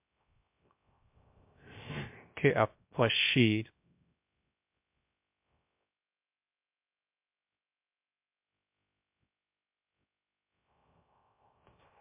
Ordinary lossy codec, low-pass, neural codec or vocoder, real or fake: MP3, 32 kbps; 3.6 kHz; codec, 16 kHz, 0.3 kbps, FocalCodec; fake